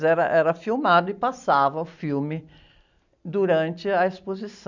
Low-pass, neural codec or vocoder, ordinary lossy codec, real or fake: 7.2 kHz; none; none; real